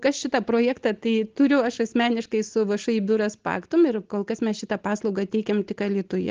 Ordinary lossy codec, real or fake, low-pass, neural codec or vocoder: Opus, 16 kbps; real; 7.2 kHz; none